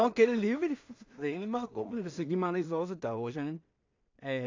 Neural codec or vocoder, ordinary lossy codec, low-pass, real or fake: codec, 16 kHz in and 24 kHz out, 0.4 kbps, LongCat-Audio-Codec, two codebook decoder; AAC, 48 kbps; 7.2 kHz; fake